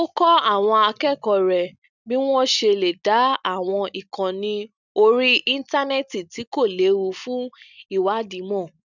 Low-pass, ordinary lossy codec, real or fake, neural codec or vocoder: 7.2 kHz; none; real; none